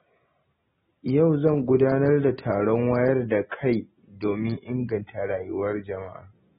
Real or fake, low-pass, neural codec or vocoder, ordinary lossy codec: real; 10.8 kHz; none; AAC, 16 kbps